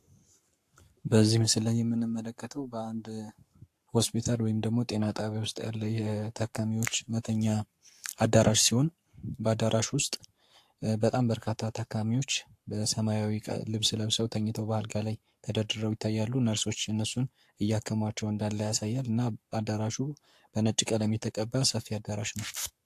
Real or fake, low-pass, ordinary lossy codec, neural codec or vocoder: fake; 14.4 kHz; AAC, 64 kbps; codec, 44.1 kHz, 7.8 kbps, Pupu-Codec